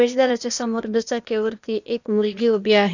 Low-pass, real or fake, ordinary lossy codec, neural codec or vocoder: 7.2 kHz; fake; none; codec, 16 kHz, 0.8 kbps, ZipCodec